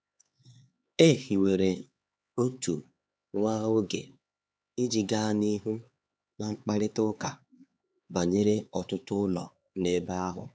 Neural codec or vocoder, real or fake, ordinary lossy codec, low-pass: codec, 16 kHz, 4 kbps, X-Codec, HuBERT features, trained on LibriSpeech; fake; none; none